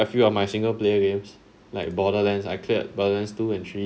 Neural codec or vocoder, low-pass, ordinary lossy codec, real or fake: none; none; none; real